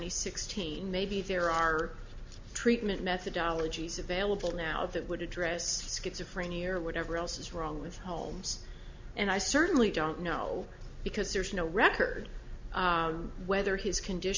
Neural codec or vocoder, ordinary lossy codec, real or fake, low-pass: none; MP3, 64 kbps; real; 7.2 kHz